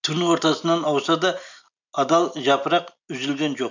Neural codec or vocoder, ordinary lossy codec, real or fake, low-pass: none; none; real; 7.2 kHz